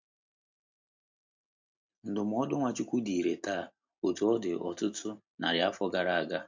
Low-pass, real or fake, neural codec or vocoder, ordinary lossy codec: 7.2 kHz; real; none; MP3, 64 kbps